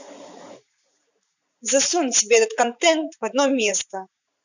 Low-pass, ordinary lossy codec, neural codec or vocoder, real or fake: 7.2 kHz; none; vocoder, 44.1 kHz, 80 mel bands, Vocos; fake